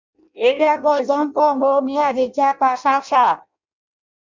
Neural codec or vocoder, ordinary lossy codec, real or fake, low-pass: codec, 16 kHz in and 24 kHz out, 0.6 kbps, FireRedTTS-2 codec; MP3, 64 kbps; fake; 7.2 kHz